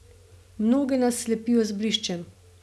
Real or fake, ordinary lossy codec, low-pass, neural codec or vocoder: real; none; none; none